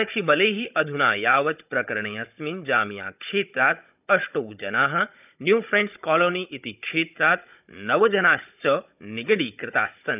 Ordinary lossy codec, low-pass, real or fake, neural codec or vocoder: none; 3.6 kHz; fake; codec, 16 kHz, 16 kbps, FunCodec, trained on Chinese and English, 50 frames a second